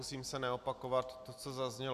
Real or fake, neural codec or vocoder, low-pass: real; none; 14.4 kHz